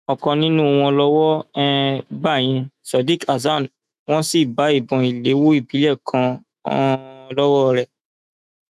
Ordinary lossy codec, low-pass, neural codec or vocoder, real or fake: none; 14.4 kHz; autoencoder, 48 kHz, 128 numbers a frame, DAC-VAE, trained on Japanese speech; fake